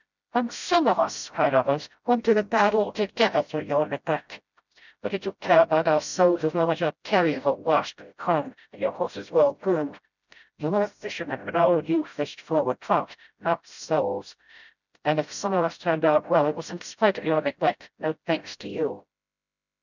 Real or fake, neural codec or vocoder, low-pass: fake; codec, 16 kHz, 0.5 kbps, FreqCodec, smaller model; 7.2 kHz